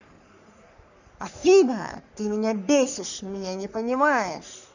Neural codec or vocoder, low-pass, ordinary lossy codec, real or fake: codec, 44.1 kHz, 3.4 kbps, Pupu-Codec; 7.2 kHz; none; fake